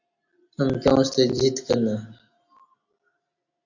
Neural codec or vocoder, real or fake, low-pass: none; real; 7.2 kHz